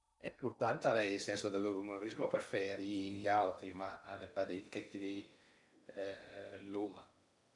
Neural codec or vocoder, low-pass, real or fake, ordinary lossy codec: codec, 16 kHz in and 24 kHz out, 0.8 kbps, FocalCodec, streaming, 65536 codes; 10.8 kHz; fake; none